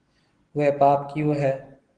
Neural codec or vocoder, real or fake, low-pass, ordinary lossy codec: none; real; 9.9 kHz; Opus, 16 kbps